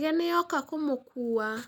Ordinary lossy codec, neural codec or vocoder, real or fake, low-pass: none; none; real; none